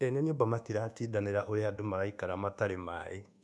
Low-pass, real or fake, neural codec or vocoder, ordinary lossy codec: none; fake; codec, 24 kHz, 1.2 kbps, DualCodec; none